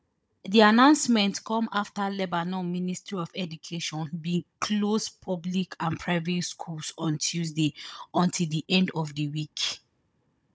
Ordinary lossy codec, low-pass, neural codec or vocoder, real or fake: none; none; codec, 16 kHz, 16 kbps, FunCodec, trained on Chinese and English, 50 frames a second; fake